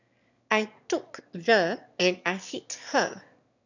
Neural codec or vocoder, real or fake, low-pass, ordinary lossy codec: autoencoder, 22.05 kHz, a latent of 192 numbers a frame, VITS, trained on one speaker; fake; 7.2 kHz; none